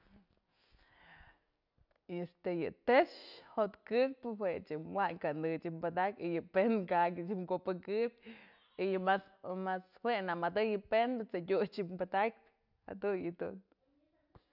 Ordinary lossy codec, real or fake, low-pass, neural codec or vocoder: none; real; 5.4 kHz; none